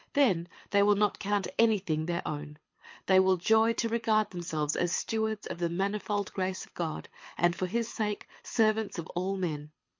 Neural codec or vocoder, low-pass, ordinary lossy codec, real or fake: codec, 24 kHz, 6 kbps, HILCodec; 7.2 kHz; MP3, 48 kbps; fake